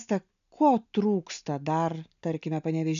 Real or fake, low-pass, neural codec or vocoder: real; 7.2 kHz; none